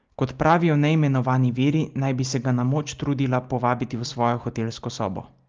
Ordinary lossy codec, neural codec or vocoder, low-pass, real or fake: Opus, 24 kbps; none; 7.2 kHz; real